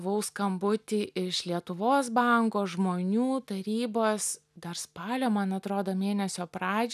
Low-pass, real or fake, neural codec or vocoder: 14.4 kHz; real; none